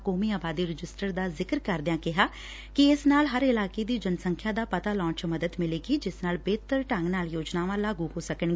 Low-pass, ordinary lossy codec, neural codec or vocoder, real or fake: none; none; none; real